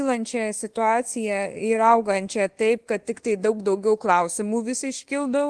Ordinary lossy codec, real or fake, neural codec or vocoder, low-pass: Opus, 16 kbps; fake; codec, 24 kHz, 1.2 kbps, DualCodec; 10.8 kHz